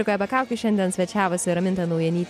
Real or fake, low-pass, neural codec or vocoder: real; 14.4 kHz; none